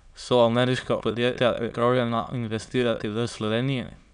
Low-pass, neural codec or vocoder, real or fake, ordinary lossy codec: 9.9 kHz; autoencoder, 22.05 kHz, a latent of 192 numbers a frame, VITS, trained on many speakers; fake; none